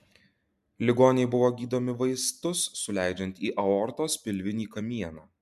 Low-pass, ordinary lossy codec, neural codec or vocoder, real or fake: 14.4 kHz; MP3, 96 kbps; none; real